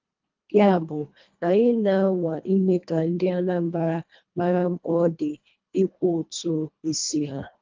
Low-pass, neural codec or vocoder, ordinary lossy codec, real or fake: 7.2 kHz; codec, 24 kHz, 1.5 kbps, HILCodec; Opus, 24 kbps; fake